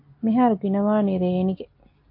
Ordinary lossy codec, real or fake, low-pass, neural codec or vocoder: MP3, 32 kbps; real; 5.4 kHz; none